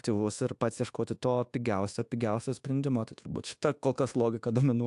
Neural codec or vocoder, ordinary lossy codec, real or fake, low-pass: codec, 24 kHz, 1.2 kbps, DualCodec; AAC, 64 kbps; fake; 10.8 kHz